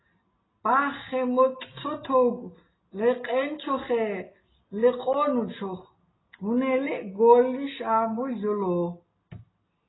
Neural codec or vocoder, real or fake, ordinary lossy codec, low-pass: none; real; AAC, 16 kbps; 7.2 kHz